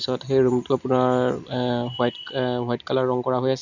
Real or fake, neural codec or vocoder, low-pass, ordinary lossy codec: real; none; 7.2 kHz; none